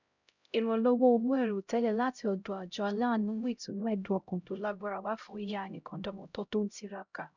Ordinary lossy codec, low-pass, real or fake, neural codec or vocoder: none; 7.2 kHz; fake; codec, 16 kHz, 0.5 kbps, X-Codec, HuBERT features, trained on LibriSpeech